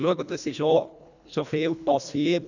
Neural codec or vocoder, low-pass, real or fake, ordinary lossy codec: codec, 24 kHz, 1.5 kbps, HILCodec; 7.2 kHz; fake; none